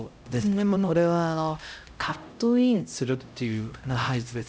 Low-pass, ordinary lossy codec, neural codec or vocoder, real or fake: none; none; codec, 16 kHz, 0.5 kbps, X-Codec, HuBERT features, trained on LibriSpeech; fake